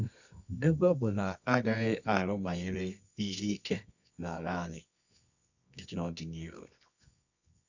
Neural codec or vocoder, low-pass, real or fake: codec, 24 kHz, 0.9 kbps, WavTokenizer, medium music audio release; 7.2 kHz; fake